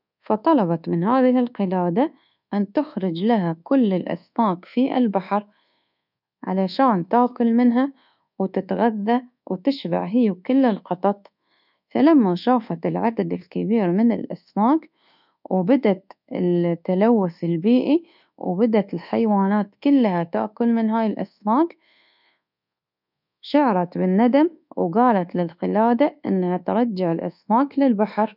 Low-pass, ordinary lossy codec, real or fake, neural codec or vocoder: 5.4 kHz; none; fake; codec, 24 kHz, 1.2 kbps, DualCodec